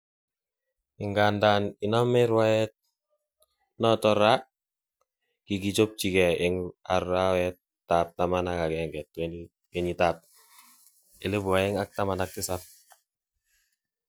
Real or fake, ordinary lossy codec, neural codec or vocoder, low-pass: real; none; none; none